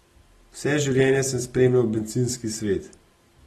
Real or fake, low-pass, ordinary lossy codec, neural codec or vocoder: real; 19.8 kHz; AAC, 32 kbps; none